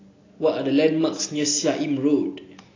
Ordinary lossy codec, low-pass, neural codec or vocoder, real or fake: AAC, 32 kbps; 7.2 kHz; none; real